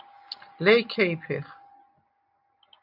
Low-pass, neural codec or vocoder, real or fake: 5.4 kHz; none; real